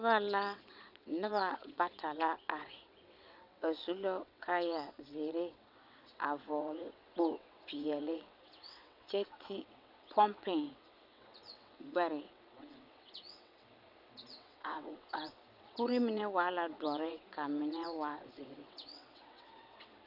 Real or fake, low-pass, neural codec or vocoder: fake; 5.4 kHz; vocoder, 22.05 kHz, 80 mel bands, WaveNeXt